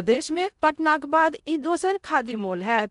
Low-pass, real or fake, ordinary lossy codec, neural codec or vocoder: 10.8 kHz; fake; none; codec, 16 kHz in and 24 kHz out, 0.6 kbps, FocalCodec, streaming, 2048 codes